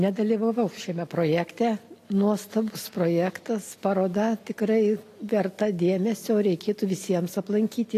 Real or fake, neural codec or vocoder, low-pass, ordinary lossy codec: real; none; 14.4 kHz; AAC, 48 kbps